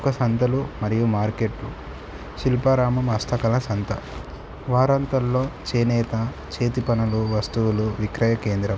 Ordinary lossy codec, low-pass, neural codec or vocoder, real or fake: none; none; none; real